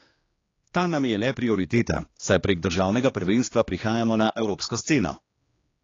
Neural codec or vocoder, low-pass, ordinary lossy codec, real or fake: codec, 16 kHz, 2 kbps, X-Codec, HuBERT features, trained on general audio; 7.2 kHz; AAC, 32 kbps; fake